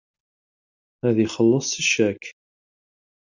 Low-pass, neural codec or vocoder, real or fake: 7.2 kHz; none; real